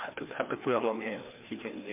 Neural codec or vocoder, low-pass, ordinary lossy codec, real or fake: codec, 16 kHz, 2 kbps, FreqCodec, larger model; 3.6 kHz; MP3, 32 kbps; fake